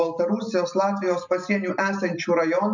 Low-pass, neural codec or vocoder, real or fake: 7.2 kHz; none; real